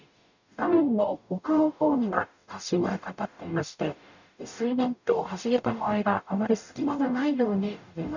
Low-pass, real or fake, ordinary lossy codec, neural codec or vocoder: 7.2 kHz; fake; none; codec, 44.1 kHz, 0.9 kbps, DAC